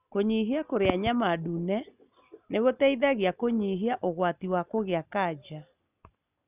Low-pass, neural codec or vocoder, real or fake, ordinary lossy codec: 3.6 kHz; none; real; none